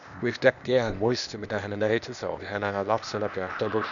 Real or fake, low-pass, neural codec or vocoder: fake; 7.2 kHz; codec, 16 kHz, 0.8 kbps, ZipCodec